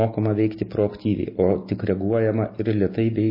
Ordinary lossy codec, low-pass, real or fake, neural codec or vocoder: MP3, 24 kbps; 5.4 kHz; real; none